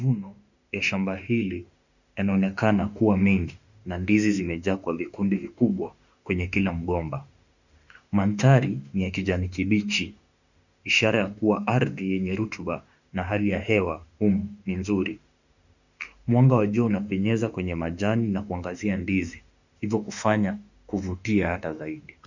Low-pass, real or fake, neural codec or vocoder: 7.2 kHz; fake; autoencoder, 48 kHz, 32 numbers a frame, DAC-VAE, trained on Japanese speech